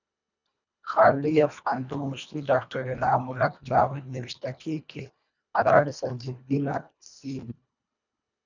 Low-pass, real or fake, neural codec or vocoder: 7.2 kHz; fake; codec, 24 kHz, 1.5 kbps, HILCodec